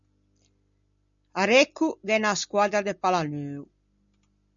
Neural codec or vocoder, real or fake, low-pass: none; real; 7.2 kHz